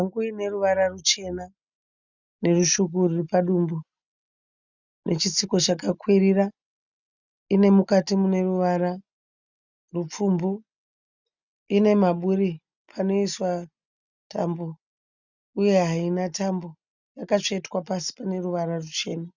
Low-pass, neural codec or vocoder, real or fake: 7.2 kHz; none; real